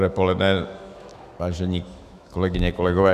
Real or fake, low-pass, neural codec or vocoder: fake; 14.4 kHz; autoencoder, 48 kHz, 128 numbers a frame, DAC-VAE, trained on Japanese speech